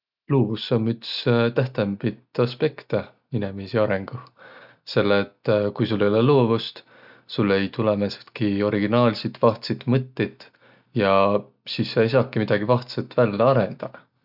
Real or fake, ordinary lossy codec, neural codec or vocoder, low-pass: real; none; none; 5.4 kHz